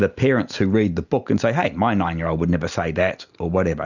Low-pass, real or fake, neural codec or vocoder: 7.2 kHz; real; none